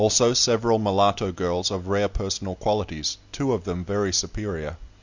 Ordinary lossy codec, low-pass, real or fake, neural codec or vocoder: Opus, 64 kbps; 7.2 kHz; real; none